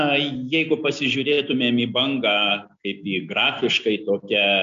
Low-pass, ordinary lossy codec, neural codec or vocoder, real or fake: 7.2 kHz; MP3, 64 kbps; none; real